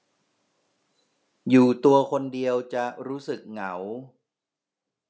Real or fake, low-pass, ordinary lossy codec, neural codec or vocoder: real; none; none; none